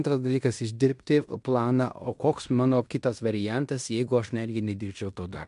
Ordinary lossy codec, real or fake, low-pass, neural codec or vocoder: MP3, 64 kbps; fake; 10.8 kHz; codec, 16 kHz in and 24 kHz out, 0.9 kbps, LongCat-Audio-Codec, fine tuned four codebook decoder